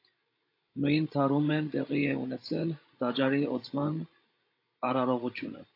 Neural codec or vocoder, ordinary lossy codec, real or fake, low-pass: codec, 16 kHz, 16 kbps, FreqCodec, larger model; AAC, 32 kbps; fake; 5.4 kHz